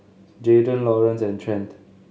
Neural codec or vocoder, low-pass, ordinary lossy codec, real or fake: none; none; none; real